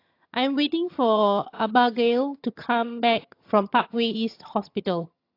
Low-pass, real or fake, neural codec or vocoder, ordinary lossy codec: 5.4 kHz; fake; vocoder, 22.05 kHz, 80 mel bands, HiFi-GAN; AAC, 32 kbps